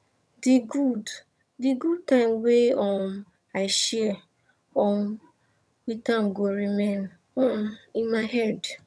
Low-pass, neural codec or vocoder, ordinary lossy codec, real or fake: none; vocoder, 22.05 kHz, 80 mel bands, HiFi-GAN; none; fake